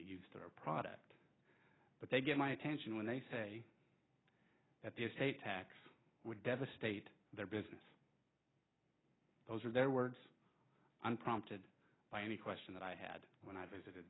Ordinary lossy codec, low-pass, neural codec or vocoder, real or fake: AAC, 16 kbps; 7.2 kHz; none; real